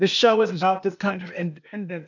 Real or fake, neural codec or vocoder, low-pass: fake; codec, 16 kHz, 0.8 kbps, ZipCodec; 7.2 kHz